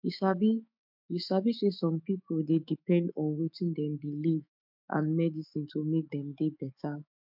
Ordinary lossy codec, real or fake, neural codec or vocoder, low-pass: MP3, 48 kbps; fake; autoencoder, 48 kHz, 128 numbers a frame, DAC-VAE, trained on Japanese speech; 5.4 kHz